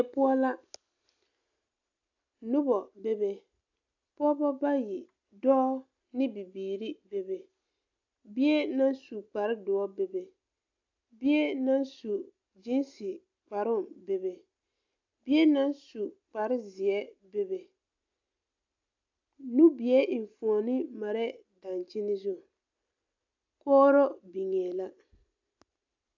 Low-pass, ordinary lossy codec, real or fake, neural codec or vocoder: 7.2 kHz; AAC, 48 kbps; real; none